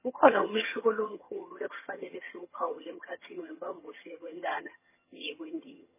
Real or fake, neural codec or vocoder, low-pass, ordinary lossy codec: fake; vocoder, 22.05 kHz, 80 mel bands, HiFi-GAN; 3.6 kHz; MP3, 16 kbps